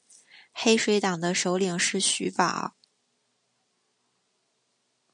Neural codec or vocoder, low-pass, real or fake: none; 9.9 kHz; real